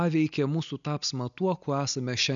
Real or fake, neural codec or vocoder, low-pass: real; none; 7.2 kHz